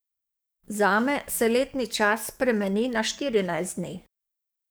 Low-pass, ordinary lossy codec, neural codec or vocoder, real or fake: none; none; codec, 44.1 kHz, 7.8 kbps, DAC; fake